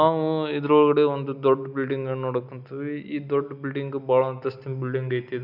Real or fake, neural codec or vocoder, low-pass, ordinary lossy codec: real; none; 5.4 kHz; none